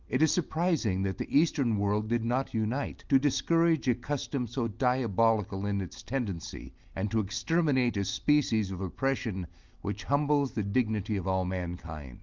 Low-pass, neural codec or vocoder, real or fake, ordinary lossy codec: 7.2 kHz; none; real; Opus, 24 kbps